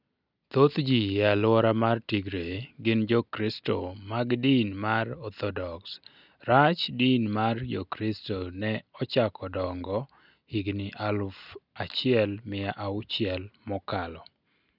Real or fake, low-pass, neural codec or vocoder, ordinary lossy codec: real; 5.4 kHz; none; none